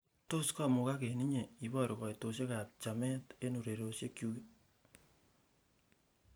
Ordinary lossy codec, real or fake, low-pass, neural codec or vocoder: none; fake; none; vocoder, 44.1 kHz, 128 mel bands every 512 samples, BigVGAN v2